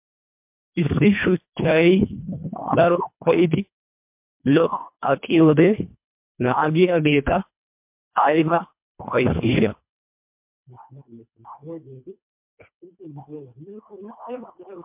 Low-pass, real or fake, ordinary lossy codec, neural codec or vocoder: 3.6 kHz; fake; MP3, 32 kbps; codec, 24 kHz, 1.5 kbps, HILCodec